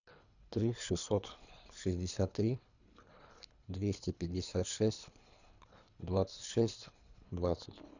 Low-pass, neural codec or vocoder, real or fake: 7.2 kHz; codec, 24 kHz, 3 kbps, HILCodec; fake